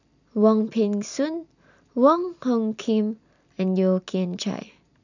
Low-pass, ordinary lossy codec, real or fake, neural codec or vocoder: 7.2 kHz; none; real; none